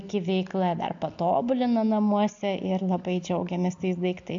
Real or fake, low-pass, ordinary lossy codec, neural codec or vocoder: real; 7.2 kHz; AAC, 64 kbps; none